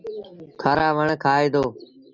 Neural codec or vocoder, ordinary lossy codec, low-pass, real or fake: none; Opus, 64 kbps; 7.2 kHz; real